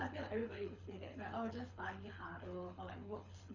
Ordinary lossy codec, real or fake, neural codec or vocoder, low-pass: none; fake; codec, 24 kHz, 6 kbps, HILCodec; 7.2 kHz